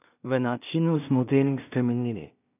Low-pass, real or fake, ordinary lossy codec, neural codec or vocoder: 3.6 kHz; fake; none; codec, 16 kHz in and 24 kHz out, 0.4 kbps, LongCat-Audio-Codec, two codebook decoder